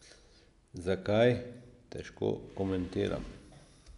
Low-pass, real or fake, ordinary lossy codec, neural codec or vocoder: 10.8 kHz; real; none; none